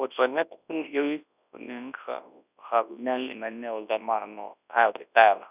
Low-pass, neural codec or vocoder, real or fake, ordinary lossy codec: 3.6 kHz; codec, 24 kHz, 0.9 kbps, WavTokenizer, large speech release; fake; none